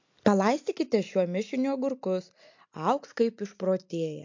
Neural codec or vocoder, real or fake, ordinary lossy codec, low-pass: none; real; MP3, 48 kbps; 7.2 kHz